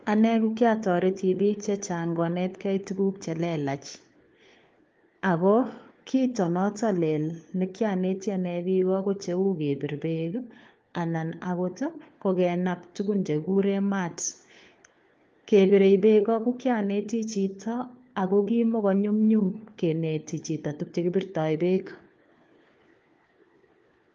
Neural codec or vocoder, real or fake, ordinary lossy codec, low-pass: codec, 16 kHz, 4 kbps, FunCodec, trained on LibriTTS, 50 frames a second; fake; Opus, 32 kbps; 7.2 kHz